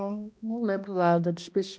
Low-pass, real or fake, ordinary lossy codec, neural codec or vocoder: none; fake; none; codec, 16 kHz, 1 kbps, X-Codec, HuBERT features, trained on balanced general audio